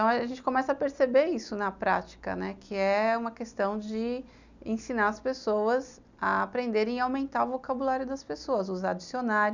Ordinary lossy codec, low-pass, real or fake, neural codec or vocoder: none; 7.2 kHz; real; none